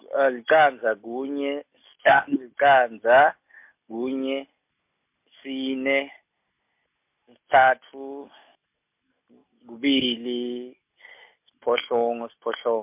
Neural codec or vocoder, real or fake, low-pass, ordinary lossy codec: none; real; 3.6 kHz; MP3, 32 kbps